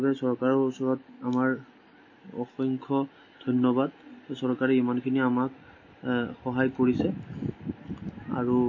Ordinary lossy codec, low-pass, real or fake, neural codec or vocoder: MP3, 32 kbps; 7.2 kHz; real; none